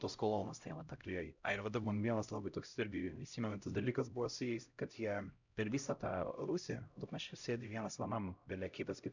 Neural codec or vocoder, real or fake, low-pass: codec, 16 kHz, 0.5 kbps, X-Codec, HuBERT features, trained on LibriSpeech; fake; 7.2 kHz